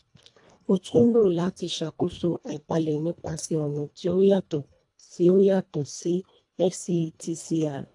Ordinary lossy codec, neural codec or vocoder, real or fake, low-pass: AAC, 64 kbps; codec, 24 kHz, 1.5 kbps, HILCodec; fake; 10.8 kHz